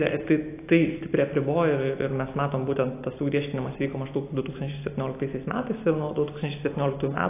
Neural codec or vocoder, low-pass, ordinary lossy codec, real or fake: none; 3.6 kHz; AAC, 24 kbps; real